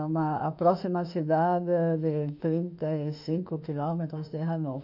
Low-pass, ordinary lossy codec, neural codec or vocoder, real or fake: 5.4 kHz; none; autoencoder, 48 kHz, 32 numbers a frame, DAC-VAE, trained on Japanese speech; fake